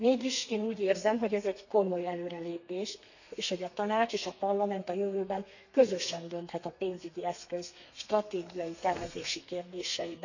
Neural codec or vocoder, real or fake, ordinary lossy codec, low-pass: codec, 32 kHz, 1.9 kbps, SNAC; fake; none; 7.2 kHz